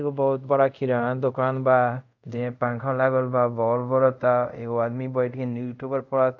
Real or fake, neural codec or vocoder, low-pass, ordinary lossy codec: fake; codec, 24 kHz, 0.5 kbps, DualCodec; 7.2 kHz; none